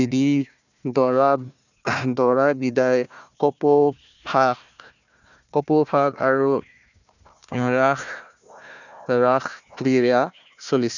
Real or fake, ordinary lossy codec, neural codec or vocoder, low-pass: fake; none; codec, 16 kHz, 1 kbps, FunCodec, trained on Chinese and English, 50 frames a second; 7.2 kHz